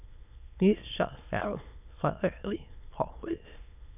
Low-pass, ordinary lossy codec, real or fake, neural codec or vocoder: 3.6 kHz; none; fake; autoencoder, 22.05 kHz, a latent of 192 numbers a frame, VITS, trained on many speakers